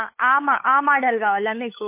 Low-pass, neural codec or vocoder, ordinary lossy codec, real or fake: 3.6 kHz; codec, 16 kHz, 8 kbps, FunCodec, trained on LibriTTS, 25 frames a second; MP3, 24 kbps; fake